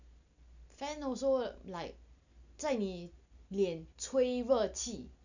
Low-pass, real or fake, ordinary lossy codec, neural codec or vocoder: 7.2 kHz; real; none; none